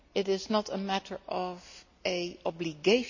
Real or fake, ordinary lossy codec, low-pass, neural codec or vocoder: real; none; 7.2 kHz; none